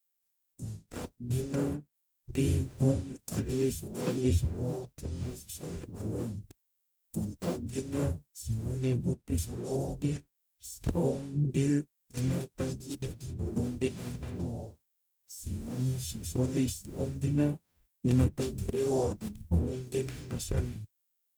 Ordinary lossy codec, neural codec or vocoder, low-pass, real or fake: none; codec, 44.1 kHz, 0.9 kbps, DAC; none; fake